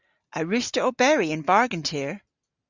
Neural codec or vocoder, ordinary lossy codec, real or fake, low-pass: none; Opus, 64 kbps; real; 7.2 kHz